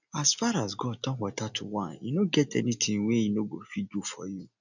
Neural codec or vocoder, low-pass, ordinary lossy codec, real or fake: none; 7.2 kHz; none; real